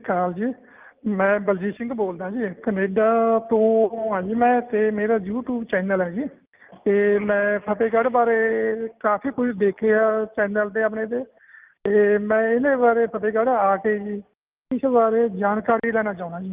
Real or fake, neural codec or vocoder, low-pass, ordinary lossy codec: real; none; 3.6 kHz; Opus, 24 kbps